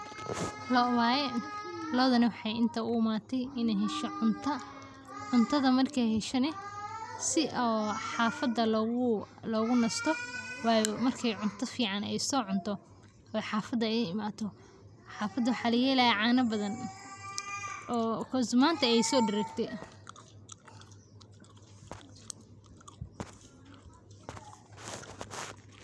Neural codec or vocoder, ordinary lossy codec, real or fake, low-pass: none; none; real; none